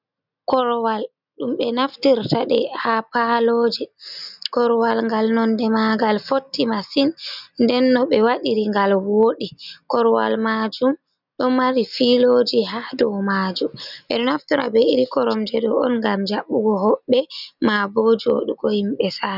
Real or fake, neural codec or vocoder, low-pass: real; none; 5.4 kHz